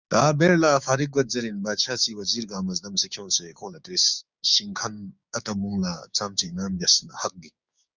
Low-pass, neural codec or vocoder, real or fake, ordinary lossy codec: 7.2 kHz; codec, 16 kHz, 6 kbps, DAC; fake; Opus, 64 kbps